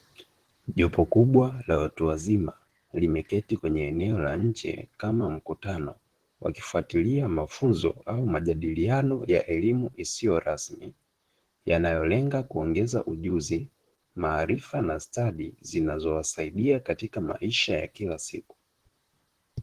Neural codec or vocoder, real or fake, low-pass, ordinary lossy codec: vocoder, 44.1 kHz, 128 mel bands, Pupu-Vocoder; fake; 14.4 kHz; Opus, 16 kbps